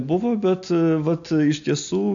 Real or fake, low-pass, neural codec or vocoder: real; 7.2 kHz; none